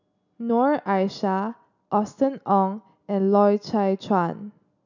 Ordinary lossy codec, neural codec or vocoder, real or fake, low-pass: none; none; real; 7.2 kHz